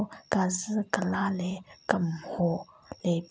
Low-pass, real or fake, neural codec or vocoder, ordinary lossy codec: none; real; none; none